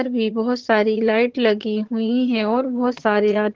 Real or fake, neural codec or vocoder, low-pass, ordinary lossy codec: fake; vocoder, 22.05 kHz, 80 mel bands, HiFi-GAN; 7.2 kHz; Opus, 16 kbps